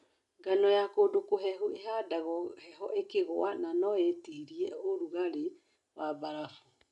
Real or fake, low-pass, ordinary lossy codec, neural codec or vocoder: real; 10.8 kHz; none; none